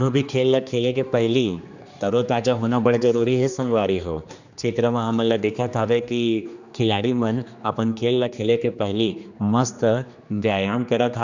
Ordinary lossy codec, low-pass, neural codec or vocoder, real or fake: none; 7.2 kHz; codec, 16 kHz, 2 kbps, X-Codec, HuBERT features, trained on general audio; fake